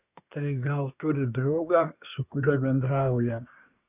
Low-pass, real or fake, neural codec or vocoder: 3.6 kHz; fake; codec, 24 kHz, 1 kbps, SNAC